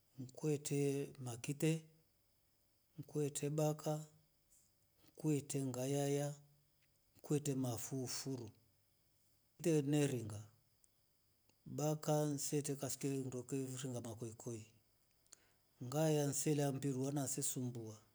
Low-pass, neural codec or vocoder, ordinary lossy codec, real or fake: none; none; none; real